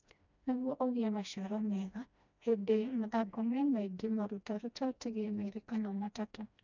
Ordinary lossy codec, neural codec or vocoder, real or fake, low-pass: none; codec, 16 kHz, 1 kbps, FreqCodec, smaller model; fake; 7.2 kHz